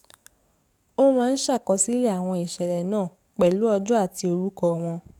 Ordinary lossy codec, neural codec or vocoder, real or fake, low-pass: none; codec, 44.1 kHz, 7.8 kbps, DAC; fake; 19.8 kHz